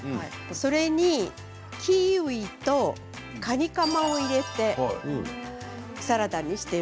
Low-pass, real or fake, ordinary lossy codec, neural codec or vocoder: none; real; none; none